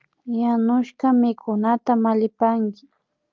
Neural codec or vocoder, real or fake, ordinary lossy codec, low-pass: none; real; Opus, 24 kbps; 7.2 kHz